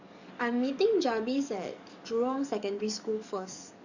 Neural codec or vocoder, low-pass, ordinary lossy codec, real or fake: codec, 44.1 kHz, 7.8 kbps, DAC; 7.2 kHz; none; fake